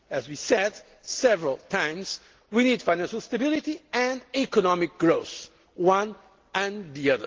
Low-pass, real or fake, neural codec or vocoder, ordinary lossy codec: 7.2 kHz; real; none; Opus, 16 kbps